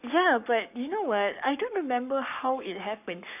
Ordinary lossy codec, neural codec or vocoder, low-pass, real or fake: none; codec, 44.1 kHz, 7.8 kbps, Pupu-Codec; 3.6 kHz; fake